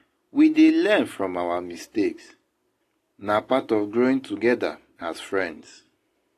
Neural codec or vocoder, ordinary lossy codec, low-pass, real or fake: none; AAC, 48 kbps; 14.4 kHz; real